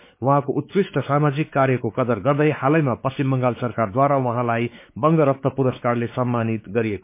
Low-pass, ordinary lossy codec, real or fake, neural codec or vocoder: 3.6 kHz; MP3, 24 kbps; fake; codec, 16 kHz, 4 kbps, X-Codec, WavLM features, trained on Multilingual LibriSpeech